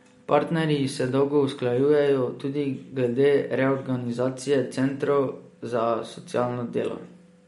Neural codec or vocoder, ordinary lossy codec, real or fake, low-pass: none; MP3, 48 kbps; real; 14.4 kHz